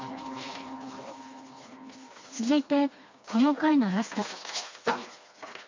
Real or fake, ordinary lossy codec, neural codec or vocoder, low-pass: fake; MP3, 48 kbps; codec, 16 kHz, 2 kbps, FreqCodec, smaller model; 7.2 kHz